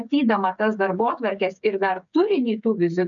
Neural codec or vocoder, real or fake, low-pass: codec, 16 kHz, 8 kbps, FreqCodec, smaller model; fake; 7.2 kHz